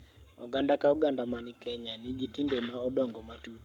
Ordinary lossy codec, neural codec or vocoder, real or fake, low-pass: none; codec, 44.1 kHz, 7.8 kbps, Pupu-Codec; fake; 19.8 kHz